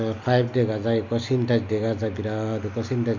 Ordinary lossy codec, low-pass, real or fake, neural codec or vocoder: none; 7.2 kHz; real; none